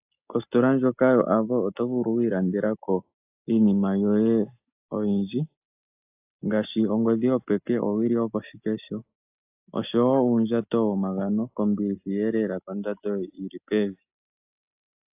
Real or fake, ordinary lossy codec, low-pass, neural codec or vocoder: real; AAC, 32 kbps; 3.6 kHz; none